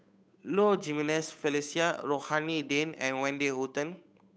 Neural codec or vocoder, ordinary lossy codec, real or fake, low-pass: codec, 16 kHz, 8 kbps, FunCodec, trained on Chinese and English, 25 frames a second; none; fake; none